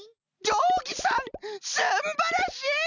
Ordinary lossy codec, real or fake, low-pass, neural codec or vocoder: none; real; 7.2 kHz; none